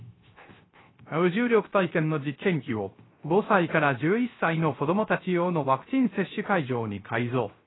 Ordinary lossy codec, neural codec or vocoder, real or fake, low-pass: AAC, 16 kbps; codec, 16 kHz, 0.3 kbps, FocalCodec; fake; 7.2 kHz